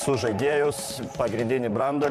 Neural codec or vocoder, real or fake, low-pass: vocoder, 44.1 kHz, 128 mel bands, Pupu-Vocoder; fake; 14.4 kHz